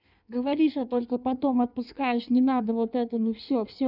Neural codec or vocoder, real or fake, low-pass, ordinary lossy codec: codec, 16 kHz in and 24 kHz out, 1.1 kbps, FireRedTTS-2 codec; fake; 5.4 kHz; none